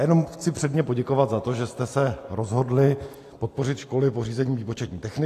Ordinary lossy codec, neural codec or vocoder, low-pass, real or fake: AAC, 64 kbps; none; 14.4 kHz; real